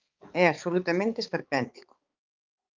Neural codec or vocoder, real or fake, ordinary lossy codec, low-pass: codec, 16 kHz, 4 kbps, X-Codec, HuBERT features, trained on balanced general audio; fake; Opus, 32 kbps; 7.2 kHz